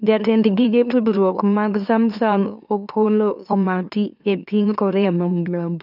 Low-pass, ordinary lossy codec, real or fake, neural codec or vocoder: 5.4 kHz; none; fake; autoencoder, 44.1 kHz, a latent of 192 numbers a frame, MeloTTS